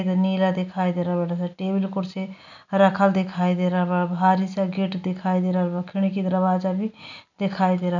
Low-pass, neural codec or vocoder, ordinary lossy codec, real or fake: 7.2 kHz; none; none; real